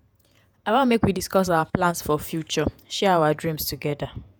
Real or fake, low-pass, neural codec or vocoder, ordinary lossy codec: fake; none; vocoder, 48 kHz, 128 mel bands, Vocos; none